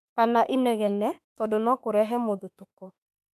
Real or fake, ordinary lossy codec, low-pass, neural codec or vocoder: fake; MP3, 96 kbps; 14.4 kHz; autoencoder, 48 kHz, 32 numbers a frame, DAC-VAE, trained on Japanese speech